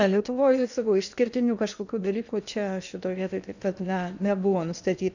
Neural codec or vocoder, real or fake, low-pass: codec, 16 kHz in and 24 kHz out, 0.8 kbps, FocalCodec, streaming, 65536 codes; fake; 7.2 kHz